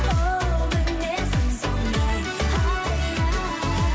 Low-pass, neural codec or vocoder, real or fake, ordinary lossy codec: none; none; real; none